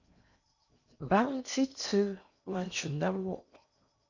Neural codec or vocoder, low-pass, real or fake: codec, 16 kHz in and 24 kHz out, 0.8 kbps, FocalCodec, streaming, 65536 codes; 7.2 kHz; fake